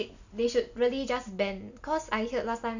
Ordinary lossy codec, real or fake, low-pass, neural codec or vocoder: none; real; 7.2 kHz; none